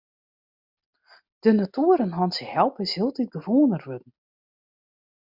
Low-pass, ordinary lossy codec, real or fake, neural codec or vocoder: 5.4 kHz; MP3, 48 kbps; real; none